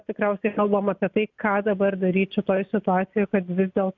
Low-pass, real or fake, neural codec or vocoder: 7.2 kHz; real; none